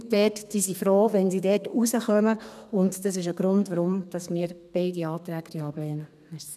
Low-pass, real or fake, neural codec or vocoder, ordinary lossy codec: 14.4 kHz; fake; codec, 32 kHz, 1.9 kbps, SNAC; none